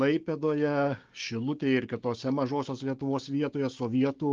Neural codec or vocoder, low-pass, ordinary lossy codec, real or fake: codec, 16 kHz, 6 kbps, DAC; 7.2 kHz; Opus, 32 kbps; fake